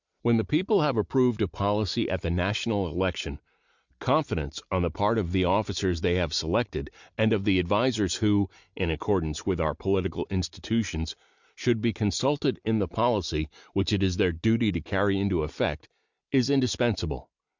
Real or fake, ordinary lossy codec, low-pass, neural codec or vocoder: real; Opus, 64 kbps; 7.2 kHz; none